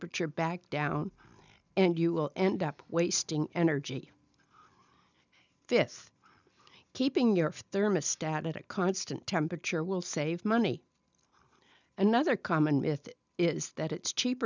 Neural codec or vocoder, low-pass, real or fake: none; 7.2 kHz; real